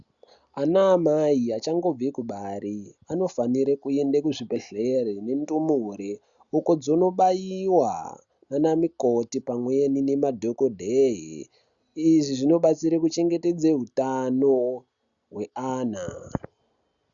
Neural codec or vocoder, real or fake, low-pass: none; real; 7.2 kHz